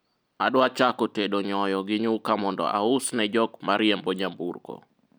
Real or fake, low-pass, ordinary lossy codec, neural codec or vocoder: real; none; none; none